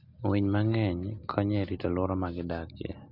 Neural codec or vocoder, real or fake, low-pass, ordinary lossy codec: none; real; 5.4 kHz; none